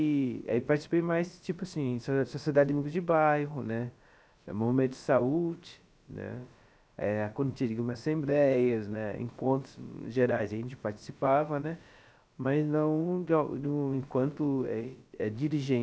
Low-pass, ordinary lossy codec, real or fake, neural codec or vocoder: none; none; fake; codec, 16 kHz, about 1 kbps, DyCAST, with the encoder's durations